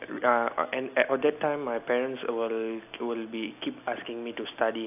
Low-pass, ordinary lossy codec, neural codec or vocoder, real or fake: 3.6 kHz; AAC, 32 kbps; none; real